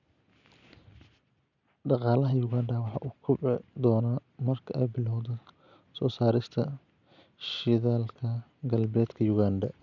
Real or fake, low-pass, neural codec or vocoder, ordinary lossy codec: real; 7.2 kHz; none; none